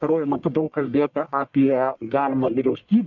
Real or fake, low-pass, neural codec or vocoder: fake; 7.2 kHz; codec, 44.1 kHz, 1.7 kbps, Pupu-Codec